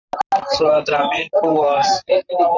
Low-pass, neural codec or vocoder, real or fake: 7.2 kHz; vocoder, 44.1 kHz, 128 mel bands, Pupu-Vocoder; fake